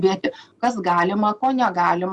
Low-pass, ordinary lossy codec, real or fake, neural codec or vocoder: 10.8 kHz; Opus, 64 kbps; real; none